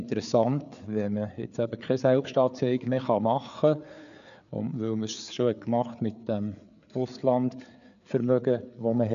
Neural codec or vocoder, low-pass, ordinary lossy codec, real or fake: codec, 16 kHz, 4 kbps, FreqCodec, larger model; 7.2 kHz; none; fake